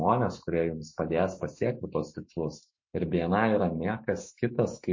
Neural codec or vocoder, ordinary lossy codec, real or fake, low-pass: autoencoder, 48 kHz, 128 numbers a frame, DAC-VAE, trained on Japanese speech; MP3, 32 kbps; fake; 7.2 kHz